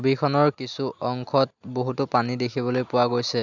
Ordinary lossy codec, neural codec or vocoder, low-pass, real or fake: none; none; 7.2 kHz; real